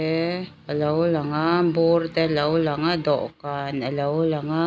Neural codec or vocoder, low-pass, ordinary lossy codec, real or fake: none; none; none; real